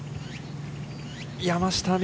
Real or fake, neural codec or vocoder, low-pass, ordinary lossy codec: real; none; none; none